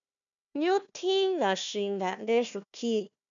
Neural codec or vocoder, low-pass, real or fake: codec, 16 kHz, 1 kbps, FunCodec, trained on Chinese and English, 50 frames a second; 7.2 kHz; fake